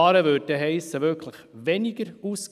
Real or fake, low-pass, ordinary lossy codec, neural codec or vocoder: real; 14.4 kHz; none; none